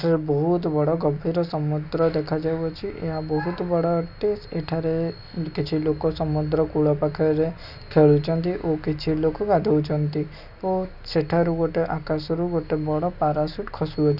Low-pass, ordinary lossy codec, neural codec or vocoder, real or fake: 5.4 kHz; none; none; real